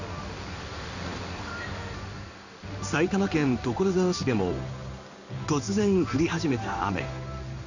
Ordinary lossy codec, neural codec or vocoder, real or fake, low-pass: none; codec, 16 kHz in and 24 kHz out, 1 kbps, XY-Tokenizer; fake; 7.2 kHz